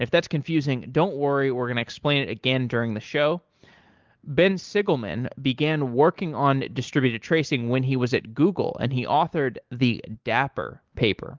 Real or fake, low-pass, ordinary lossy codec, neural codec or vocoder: real; 7.2 kHz; Opus, 32 kbps; none